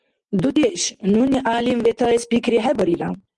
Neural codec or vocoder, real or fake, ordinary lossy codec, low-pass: none; real; Opus, 24 kbps; 10.8 kHz